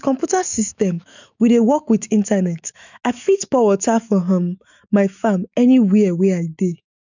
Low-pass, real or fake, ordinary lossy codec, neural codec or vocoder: 7.2 kHz; fake; none; autoencoder, 48 kHz, 128 numbers a frame, DAC-VAE, trained on Japanese speech